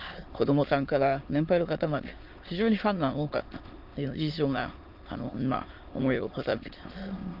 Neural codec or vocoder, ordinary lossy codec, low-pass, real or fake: autoencoder, 22.05 kHz, a latent of 192 numbers a frame, VITS, trained on many speakers; Opus, 32 kbps; 5.4 kHz; fake